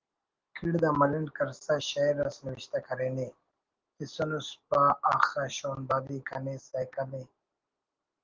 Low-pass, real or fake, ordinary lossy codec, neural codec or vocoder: 7.2 kHz; real; Opus, 16 kbps; none